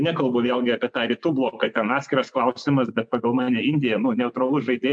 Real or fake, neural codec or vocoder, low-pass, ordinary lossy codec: fake; vocoder, 24 kHz, 100 mel bands, Vocos; 9.9 kHz; MP3, 64 kbps